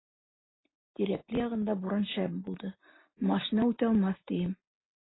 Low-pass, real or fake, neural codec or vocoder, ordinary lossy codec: 7.2 kHz; real; none; AAC, 16 kbps